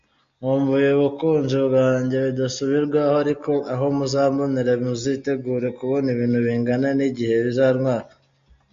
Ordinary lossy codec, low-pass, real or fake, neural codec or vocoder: MP3, 48 kbps; 7.2 kHz; real; none